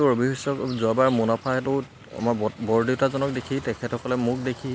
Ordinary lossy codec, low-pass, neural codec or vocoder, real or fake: none; none; none; real